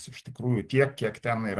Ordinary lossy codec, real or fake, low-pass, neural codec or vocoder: Opus, 16 kbps; real; 10.8 kHz; none